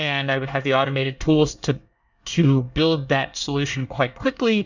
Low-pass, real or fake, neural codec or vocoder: 7.2 kHz; fake; codec, 24 kHz, 1 kbps, SNAC